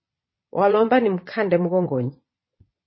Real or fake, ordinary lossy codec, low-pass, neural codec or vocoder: fake; MP3, 24 kbps; 7.2 kHz; vocoder, 22.05 kHz, 80 mel bands, Vocos